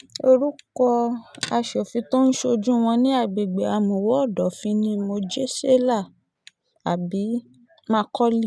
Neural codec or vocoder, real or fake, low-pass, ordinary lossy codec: none; real; none; none